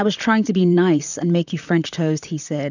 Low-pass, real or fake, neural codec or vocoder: 7.2 kHz; fake; vocoder, 44.1 kHz, 128 mel bands, Pupu-Vocoder